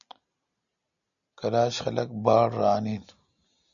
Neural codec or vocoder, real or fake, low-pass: none; real; 7.2 kHz